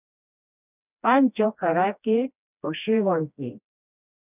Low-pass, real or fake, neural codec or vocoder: 3.6 kHz; fake; codec, 16 kHz, 1 kbps, FreqCodec, smaller model